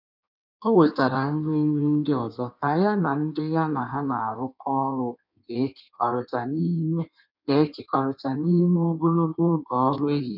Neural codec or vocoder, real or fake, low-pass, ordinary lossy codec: codec, 16 kHz in and 24 kHz out, 1.1 kbps, FireRedTTS-2 codec; fake; 5.4 kHz; none